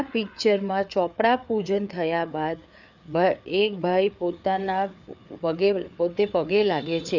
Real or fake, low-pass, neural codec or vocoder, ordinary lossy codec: fake; 7.2 kHz; codec, 16 kHz, 4 kbps, FreqCodec, larger model; none